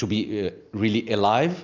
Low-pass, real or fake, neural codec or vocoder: 7.2 kHz; real; none